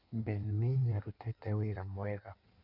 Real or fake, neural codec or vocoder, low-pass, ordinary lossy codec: fake; codec, 16 kHz in and 24 kHz out, 1.1 kbps, FireRedTTS-2 codec; 5.4 kHz; none